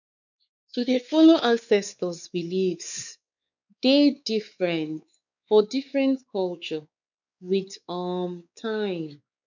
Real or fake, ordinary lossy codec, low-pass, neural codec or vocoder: fake; none; 7.2 kHz; codec, 16 kHz, 4 kbps, X-Codec, WavLM features, trained on Multilingual LibriSpeech